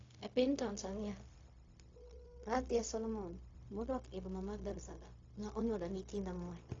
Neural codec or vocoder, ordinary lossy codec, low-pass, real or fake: codec, 16 kHz, 0.4 kbps, LongCat-Audio-Codec; AAC, 32 kbps; 7.2 kHz; fake